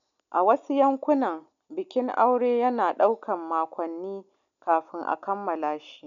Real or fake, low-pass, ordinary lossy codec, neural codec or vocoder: real; 7.2 kHz; none; none